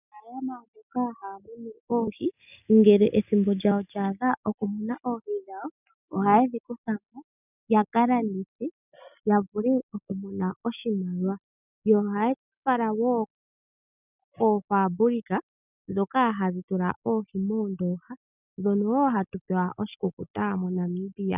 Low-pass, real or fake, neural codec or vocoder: 3.6 kHz; real; none